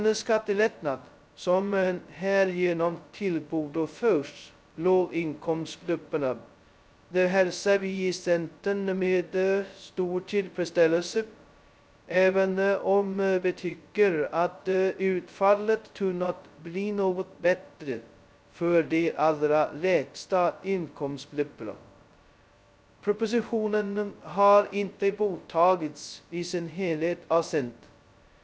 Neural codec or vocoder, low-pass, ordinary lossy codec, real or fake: codec, 16 kHz, 0.2 kbps, FocalCodec; none; none; fake